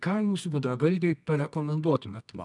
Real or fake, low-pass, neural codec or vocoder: fake; 10.8 kHz; codec, 24 kHz, 0.9 kbps, WavTokenizer, medium music audio release